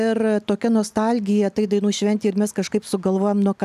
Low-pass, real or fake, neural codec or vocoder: 14.4 kHz; real; none